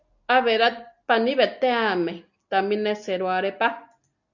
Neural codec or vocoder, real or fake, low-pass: none; real; 7.2 kHz